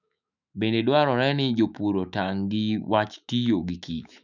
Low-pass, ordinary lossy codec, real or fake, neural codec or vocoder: 7.2 kHz; none; fake; autoencoder, 48 kHz, 128 numbers a frame, DAC-VAE, trained on Japanese speech